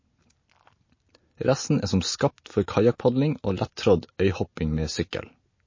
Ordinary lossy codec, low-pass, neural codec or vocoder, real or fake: MP3, 32 kbps; 7.2 kHz; none; real